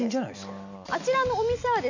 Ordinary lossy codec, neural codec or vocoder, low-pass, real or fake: none; none; 7.2 kHz; real